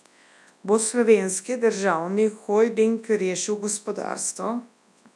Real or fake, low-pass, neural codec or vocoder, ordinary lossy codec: fake; none; codec, 24 kHz, 0.9 kbps, WavTokenizer, large speech release; none